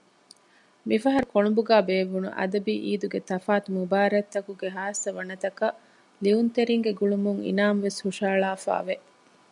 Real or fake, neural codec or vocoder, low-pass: real; none; 10.8 kHz